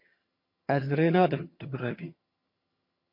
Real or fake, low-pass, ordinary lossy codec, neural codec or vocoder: fake; 5.4 kHz; MP3, 32 kbps; vocoder, 22.05 kHz, 80 mel bands, HiFi-GAN